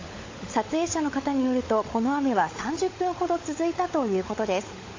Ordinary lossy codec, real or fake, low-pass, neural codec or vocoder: AAC, 32 kbps; fake; 7.2 kHz; codec, 16 kHz, 16 kbps, FunCodec, trained on LibriTTS, 50 frames a second